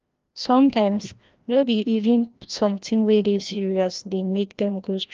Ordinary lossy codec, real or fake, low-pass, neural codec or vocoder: Opus, 32 kbps; fake; 7.2 kHz; codec, 16 kHz, 1 kbps, FreqCodec, larger model